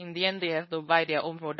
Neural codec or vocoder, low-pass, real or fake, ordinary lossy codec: codec, 16 kHz, 4.8 kbps, FACodec; 7.2 kHz; fake; MP3, 24 kbps